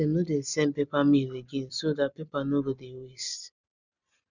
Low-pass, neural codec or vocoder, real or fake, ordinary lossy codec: 7.2 kHz; vocoder, 44.1 kHz, 128 mel bands every 256 samples, BigVGAN v2; fake; none